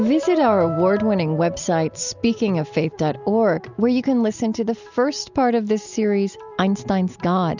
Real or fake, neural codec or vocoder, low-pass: real; none; 7.2 kHz